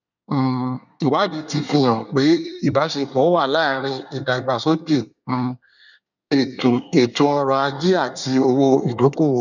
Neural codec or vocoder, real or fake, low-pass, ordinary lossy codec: codec, 24 kHz, 1 kbps, SNAC; fake; 7.2 kHz; none